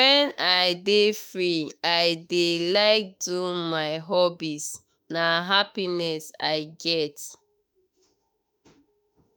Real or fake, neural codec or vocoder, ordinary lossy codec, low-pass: fake; autoencoder, 48 kHz, 32 numbers a frame, DAC-VAE, trained on Japanese speech; none; none